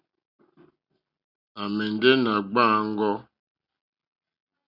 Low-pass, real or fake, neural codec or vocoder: 5.4 kHz; real; none